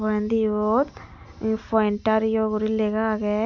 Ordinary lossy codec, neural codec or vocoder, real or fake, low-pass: none; none; real; 7.2 kHz